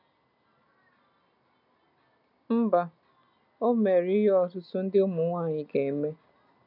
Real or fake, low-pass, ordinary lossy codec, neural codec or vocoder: real; 5.4 kHz; none; none